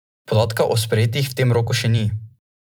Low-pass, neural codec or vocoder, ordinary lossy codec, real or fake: none; vocoder, 44.1 kHz, 128 mel bands every 256 samples, BigVGAN v2; none; fake